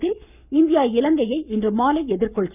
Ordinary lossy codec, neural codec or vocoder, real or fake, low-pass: none; codec, 44.1 kHz, 7.8 kbps, Pupu-Codec; fake; 3.6 kHz